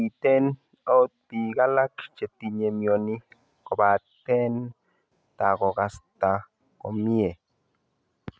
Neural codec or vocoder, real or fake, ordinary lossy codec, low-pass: none; real; none; none